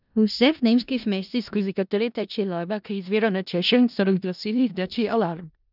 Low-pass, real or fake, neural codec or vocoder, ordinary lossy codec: 5.4 kHz; fake; codec, 16 kHz in and 24 kHz out, 0.4 kbps, LongCat-Audio-Codec, four codebook decoder; none